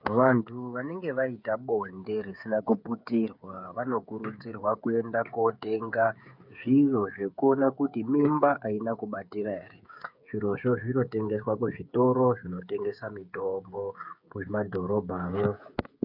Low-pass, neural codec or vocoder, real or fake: 5.4 kHz; codec, 16 kHz, 8 kbps, FreqCodec, smaller model; fake